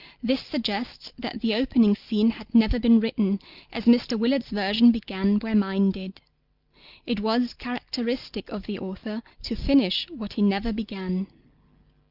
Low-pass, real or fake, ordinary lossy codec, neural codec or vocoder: 5.4 kHz; real; Opus, 24 kbps; none